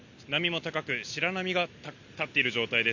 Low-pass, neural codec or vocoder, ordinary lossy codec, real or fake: 7.2 kHz; none; MP3, 64 kbps; real